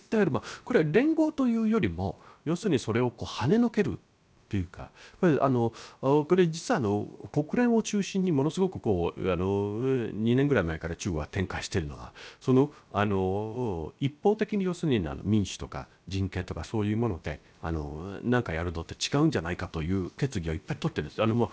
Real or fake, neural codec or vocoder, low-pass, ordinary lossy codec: fake; codec, 16 kHz, about 1 kbps, DyCAST, with the encoder's durations; none; none